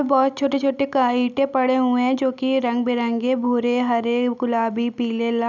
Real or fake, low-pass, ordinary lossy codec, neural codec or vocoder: real; 7.2 kHz; none; none